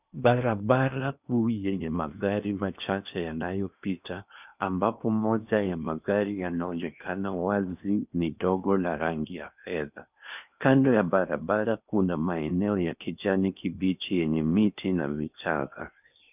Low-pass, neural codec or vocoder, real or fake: 3.6 kHz; codec, 16 kHz in and 24 kHz out, 0.8 kbps, FocalCodec, streaming, 65536 codes; fake